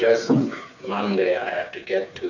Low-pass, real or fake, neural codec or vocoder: 7.2 kHz; fake; codec, 16 kHz, 4 kbps, FreqCodec, smaller model